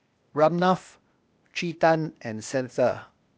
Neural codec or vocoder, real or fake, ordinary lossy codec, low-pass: codec, 16 kHz, 0.8 kbps, ZipCodec; fake; none; none